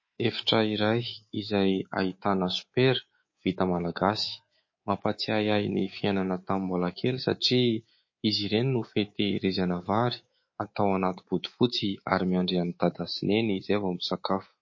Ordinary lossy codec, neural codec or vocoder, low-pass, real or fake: MP3, 32 kbps; codec, 24 kHz, 3.1 kbps, DualCodec; 7.2 kHz; fake